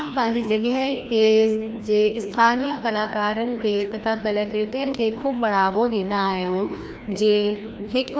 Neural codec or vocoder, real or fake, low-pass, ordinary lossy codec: codec, 16 kHz, 1 kbps, FreqCodec, larger model; fake; none; none